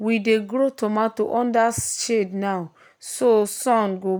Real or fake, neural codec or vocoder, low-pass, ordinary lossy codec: real; none; none; none